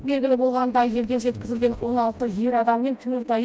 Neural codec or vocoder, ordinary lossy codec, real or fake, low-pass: codec, 16 kHz, 1 kbps, FreqCodec, smaller model; none; fake; none